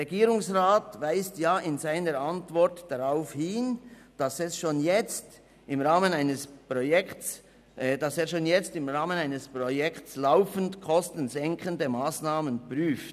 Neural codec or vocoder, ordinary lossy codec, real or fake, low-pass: none; none; real; 14.4 kHz